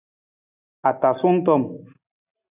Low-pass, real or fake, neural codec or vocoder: 3.6 kHz; real; none